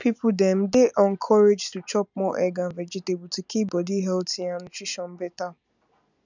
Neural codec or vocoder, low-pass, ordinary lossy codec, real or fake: none; 7.2 kHz; none; real